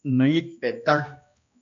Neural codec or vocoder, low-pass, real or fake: codec, 16 kHz, 1 kbps, X-Codec, HuBERT features, trained on balanced general audio; 7.2 kHz; fake